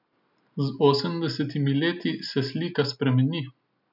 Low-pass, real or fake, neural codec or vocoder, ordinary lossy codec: 5.4 kHz; real; none; none